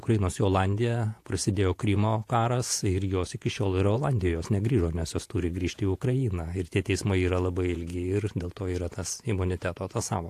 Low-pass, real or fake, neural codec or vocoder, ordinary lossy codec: 14.4 kHz; fake; vocoder, 48 kHz, 128 mel bands, Vocos; AAC, 64 kbps